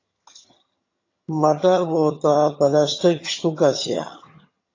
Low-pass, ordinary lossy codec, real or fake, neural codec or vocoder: 7.2 kHz; AAC, 32 kbps; fake; vocoder, 22.05 kHz, 80 mel bands, HiFi-GAN